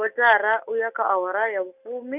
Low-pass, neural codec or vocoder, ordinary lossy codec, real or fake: 3.6 kHz; none; none; real